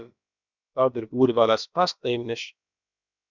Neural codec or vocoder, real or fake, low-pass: codec, 16 kHz, about 1 kbps, DyCAST, with the encoder's durations; fake; 7.2 kHz